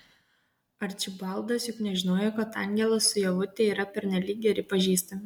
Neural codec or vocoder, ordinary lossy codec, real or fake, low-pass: none; MP3, 96 kbps; real; 19.8 kHz